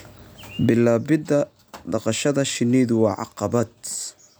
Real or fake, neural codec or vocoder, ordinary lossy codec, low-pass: real; none; none; none